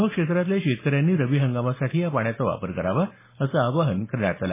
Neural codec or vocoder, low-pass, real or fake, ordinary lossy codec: none; 3.6 kHz; real; MP3, 16 kbps